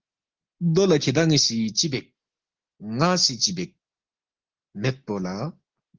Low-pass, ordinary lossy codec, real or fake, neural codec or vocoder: 7.2 kHz; Opus, 16 kbps; real; none